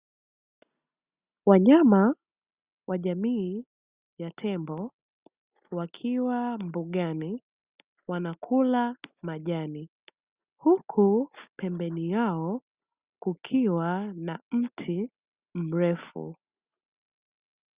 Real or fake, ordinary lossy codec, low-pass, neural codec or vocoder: real; Opus, 64 kbps; 3.6 kHz; none